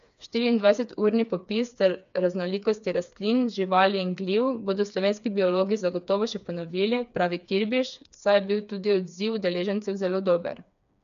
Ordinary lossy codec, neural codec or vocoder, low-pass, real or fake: MP3, 96 kbps; codec, 16 kHz, 4 kbps, FreqCodec, smaller model; 7.2 kHz; fake